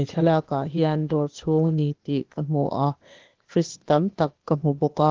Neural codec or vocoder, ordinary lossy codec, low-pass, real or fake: codec, 16 kHz, 0.8 kbps, ZipCodec; Opus, 16 kbps; 7.2 kHz; fake